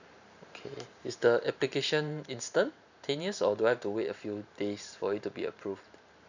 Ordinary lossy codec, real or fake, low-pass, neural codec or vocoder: none; fake; 7.2 kHz; vocoder, 44.1 kHz, 128 mel bands every 512 samples, BigVGAN v2